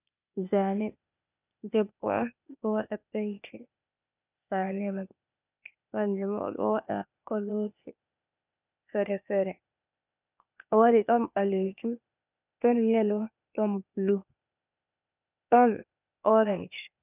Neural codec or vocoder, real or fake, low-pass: codec, 16 kHz, 0.8 kbps, ZipCodec; fake; 3.6 kHz